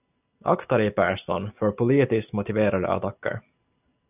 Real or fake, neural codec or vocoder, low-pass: real; none; 3.6 kHz